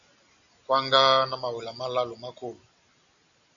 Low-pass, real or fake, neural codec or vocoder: 7.2 kHz; real; none